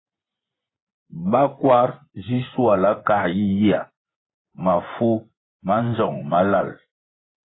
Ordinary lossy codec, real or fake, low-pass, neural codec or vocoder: AAC, 16 kbps; fake; 7.2 kHz; vocoder, 24 kHz, 100 mel bands, Vocos